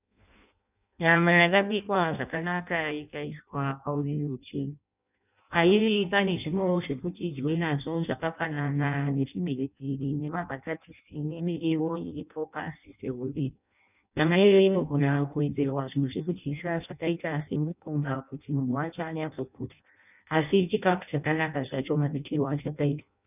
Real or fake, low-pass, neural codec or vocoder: fake; 3.6 kHz; codec, 16 kHz in and 24 kHz out, 0.6 kbps, FireRedTTS-2 codec